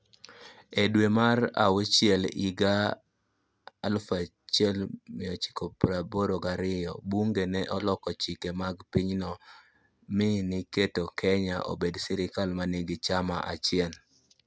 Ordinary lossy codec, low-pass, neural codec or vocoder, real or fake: none; none; none; real